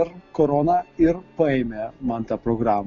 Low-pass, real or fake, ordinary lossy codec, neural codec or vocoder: 7.2 kHz; real; Opus, 64 kbps; none